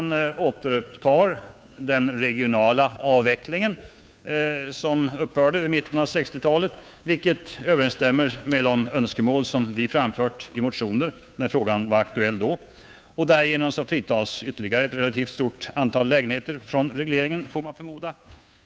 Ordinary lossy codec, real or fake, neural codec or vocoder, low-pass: none; fake; codec, 16 kHz, 2 kbps, FunCodec, trained on Chinese and English, 25 frames a second; none